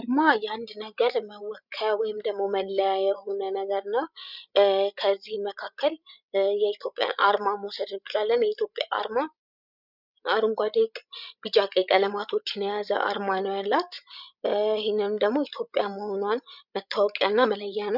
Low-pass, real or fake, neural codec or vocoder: 5.4 kHz; fake; codec, 16 kHz, 16 kbps, FreqCodec, larger model